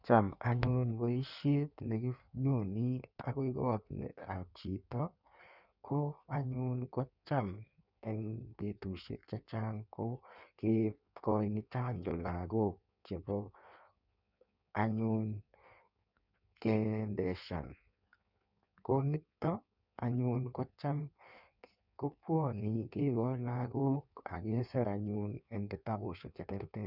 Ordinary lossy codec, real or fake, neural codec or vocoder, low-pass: none; fake; codec, 16 kHz in and 24 kHz out, 1.1 kbps, FireRedTTS-2 codec; 5.4 kHz